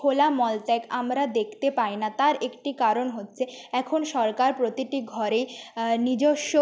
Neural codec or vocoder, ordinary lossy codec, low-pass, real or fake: none; none; none; real